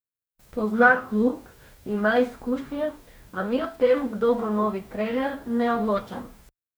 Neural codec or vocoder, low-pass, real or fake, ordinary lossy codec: codec, 44.1 kHz, 2.6 kbps, DAC; none; fake; none